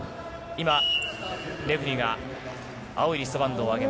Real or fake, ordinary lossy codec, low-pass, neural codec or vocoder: real; none; none; none